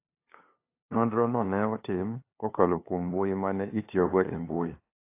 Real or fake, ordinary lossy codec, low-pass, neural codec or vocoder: fake; AAC, 24 kbps; 3.6 kHz; codec, 16 kHz, 2 kbps, FunCodec, trained on LibriTTS, 25 frames a second